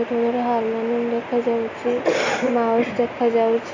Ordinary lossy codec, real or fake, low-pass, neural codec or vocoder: MP3, 48 kbps; real; 7.2 kHz; none